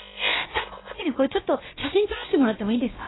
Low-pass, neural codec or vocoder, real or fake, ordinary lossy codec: 7.2 kHz; codec, 16 kHz, about 1 kbps, DyCAST, with the encoder's durations; fake; AAC, 16 kbps